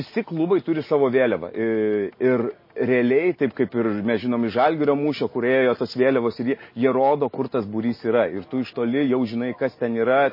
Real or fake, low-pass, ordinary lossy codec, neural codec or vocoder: real; 5.4 kHz; MP3, 24 kbps; none